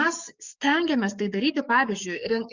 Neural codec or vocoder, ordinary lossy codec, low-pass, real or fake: codec, 44.1 kHz, 7.8 kbps, DAC; Opus, 64 kbps; 7.2 kHz; fake